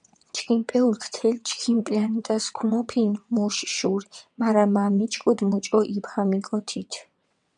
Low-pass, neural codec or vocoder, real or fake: 9.9 kHz; vocoder, 22.05 kHz, 80 mel bands, WaveNeXt; fake